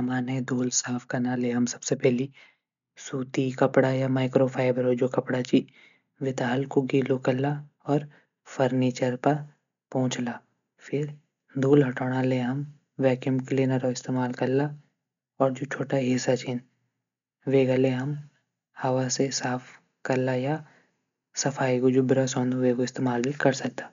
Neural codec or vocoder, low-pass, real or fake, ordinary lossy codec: none; 7.2 kHz; real; MP3, 64 kbps